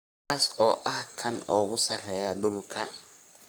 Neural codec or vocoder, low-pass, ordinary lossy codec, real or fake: codec, 44.1 kHz, 3.4 kbps, Pupu-Codec; none; none; fake